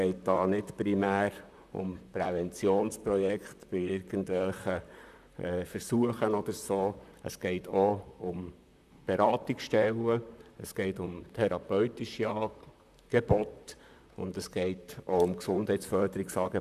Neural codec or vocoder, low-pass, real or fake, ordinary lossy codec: vocoder, 44.1 kHz, 128 mel bands, Pupu-Vocoder; 14.4 kHz; fake; none